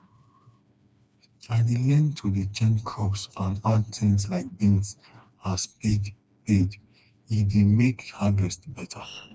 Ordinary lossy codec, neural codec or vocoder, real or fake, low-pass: none; codec, 16 kHz, 2 kbps, FreqCodec, smaller model; fake; none